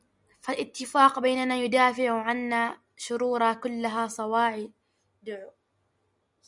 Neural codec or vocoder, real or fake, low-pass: none; real; 10.8 kHz